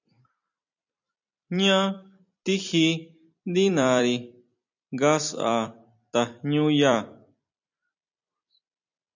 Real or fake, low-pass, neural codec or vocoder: fake; 7.2 kHz; vocoder, 44.1 kHz, 128 mel bands every 256 samples, BigVGAN v2